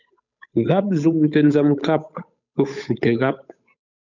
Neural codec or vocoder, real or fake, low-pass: codec, 16 kHz, 8 kbps, FunCodec, trained on Chinese and English, 25 frames a second; fake; 7.2 kHz